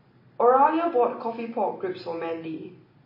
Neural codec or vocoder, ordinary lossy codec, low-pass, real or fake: none; MP3, 24 kbps; 5.4 kHz; real